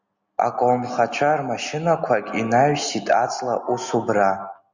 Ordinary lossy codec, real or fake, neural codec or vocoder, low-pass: Opus, 64 kbps; real; none; 7.2 kHz